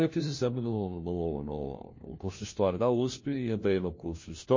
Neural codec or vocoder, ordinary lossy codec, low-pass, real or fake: codec, 16 kHz, 1 kbps, FunCodec, trained on LibriTTS, 50 frames a second; MP3, 32 kbps; 7.2 kHz; fake